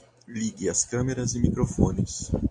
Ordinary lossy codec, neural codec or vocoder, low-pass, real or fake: AAC, 64 kbps; none; 10.8 kHz; real